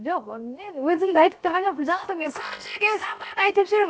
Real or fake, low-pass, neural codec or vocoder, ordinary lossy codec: fake; none; codec, 16 kHz, 0.7 kbps, FocalCodec; none